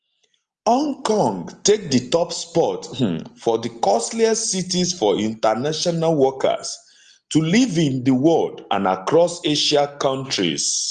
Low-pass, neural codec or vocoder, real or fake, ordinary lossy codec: 10.8 kHz; none; real; Opus, 24 kbps